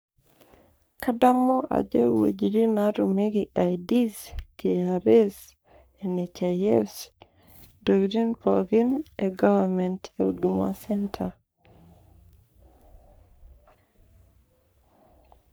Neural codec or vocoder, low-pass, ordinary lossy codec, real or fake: codec, 44.1 kHz, 3.4 kbps, Pupu-Codec; none; none; fake